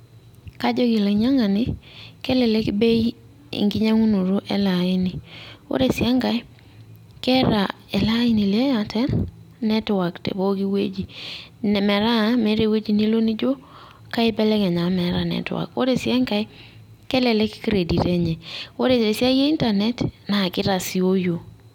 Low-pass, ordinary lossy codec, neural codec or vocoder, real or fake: 19.8 kHz; none; none; real